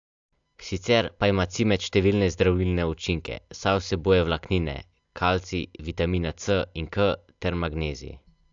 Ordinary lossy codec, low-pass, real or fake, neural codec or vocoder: AAC, 64 kbps; 7.2 kHz; real; none